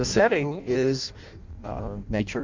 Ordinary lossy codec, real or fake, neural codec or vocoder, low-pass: MP3, 48 kbps; fake; codec, 16 kHz in and 24 kHz out, 0.6 kbps, FireRedTTS-2 codec; 7.2 kHz